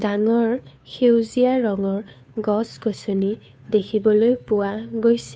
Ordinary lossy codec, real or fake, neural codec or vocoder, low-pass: none; fake; codec, 16 kHz, 2 kbps, FunCodec, trained on Chinese and English, 25 frames a second; none